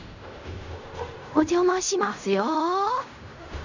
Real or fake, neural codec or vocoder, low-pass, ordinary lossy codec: fake; codec, 16 kHz in and 24 kHz out, 0.4 kbps, LongCat-Audio-Codec, fine tuned four codebook decoder; 7.2 kHz; none